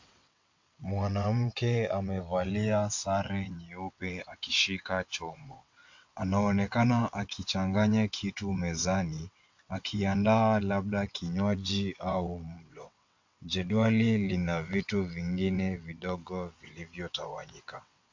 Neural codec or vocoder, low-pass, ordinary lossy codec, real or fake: vocoder, 22.05 kHz, 80 mel bands, WaveNeXt; 7.2 kHz; MP3, 48 kbps; fake